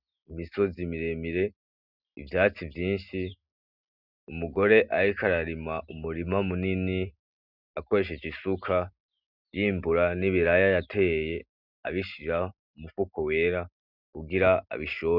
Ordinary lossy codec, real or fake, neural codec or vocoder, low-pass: Opus, 64 kbps; real; none; 5.4 kHz